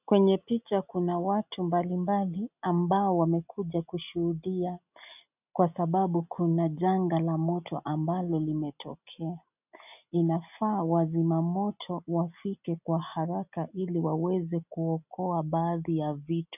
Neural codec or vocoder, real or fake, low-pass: none; real; 3.6 kHz